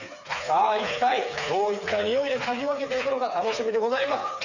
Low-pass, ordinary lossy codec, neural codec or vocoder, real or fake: 7.2 kHz; none; codec, 16 kHz, 4 kbps, FreqCodec, smaller model; fake